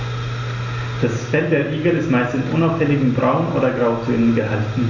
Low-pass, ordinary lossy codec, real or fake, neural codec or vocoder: 7.2 kHz; none; real; none